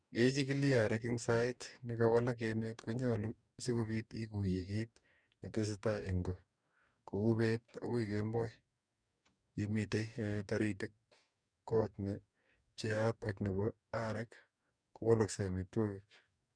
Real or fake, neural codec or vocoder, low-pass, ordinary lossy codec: fake; codec, 44.1 kHz, 2.6 kbps, DAC; 9.9 kHz; none